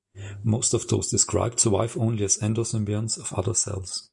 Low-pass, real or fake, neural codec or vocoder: 10.8 kHz; real; none